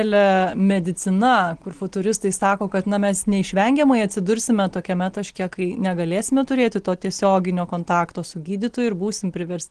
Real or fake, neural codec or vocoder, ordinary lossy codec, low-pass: real; none; Opus, 16 kbps; 9.9 kHz